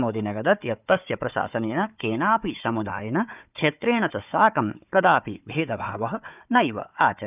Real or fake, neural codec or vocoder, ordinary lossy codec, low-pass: fake; codec, 16 kHz, 4 kbps, FunCodec, trained on Chinese and English, 50 frames a second; none; 3.6 kHz